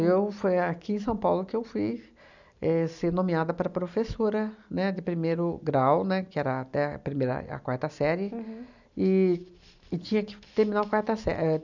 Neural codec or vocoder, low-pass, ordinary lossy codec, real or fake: none; 7.2 kHz; none; real